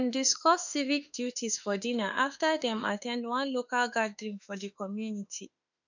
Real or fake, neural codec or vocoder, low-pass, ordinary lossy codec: fake; autoencoder, 48 kHz, 32 numbers a frame, DAC-VAE, trained on Japanese speech; 7.2 kHz; none